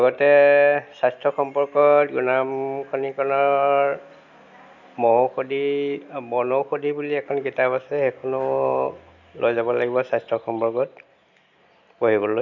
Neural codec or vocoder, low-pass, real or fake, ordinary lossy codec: none; 7.2 kHz; real; none